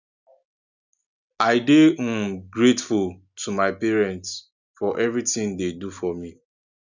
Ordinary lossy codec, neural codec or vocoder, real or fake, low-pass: none; none; real; 7.2 kHz